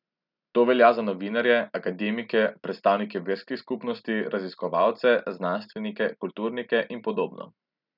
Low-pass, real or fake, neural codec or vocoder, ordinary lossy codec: 5.4 kHz; real; none; none